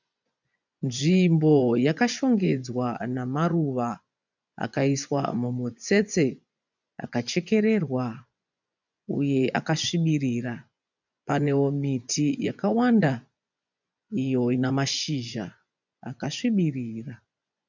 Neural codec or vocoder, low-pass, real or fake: vocoder, 24 kHz, 100 mel bands, Vocos; 7.2 kHz; fake